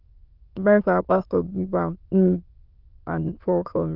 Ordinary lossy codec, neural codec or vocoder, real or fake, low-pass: Opus, 24 kbps; autoencoder, 22.05 kHz, a latent of 192 numbers a frame, VITS, trained on many speakers; fake; 5.4 kHz